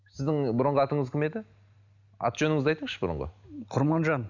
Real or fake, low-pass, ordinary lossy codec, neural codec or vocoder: real; 7.2 kHz; none; none